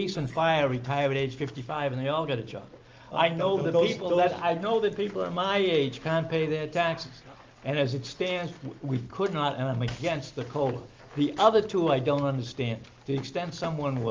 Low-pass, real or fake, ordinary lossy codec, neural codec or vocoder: 7.2 kHz; real; Opus, 24 kbps; none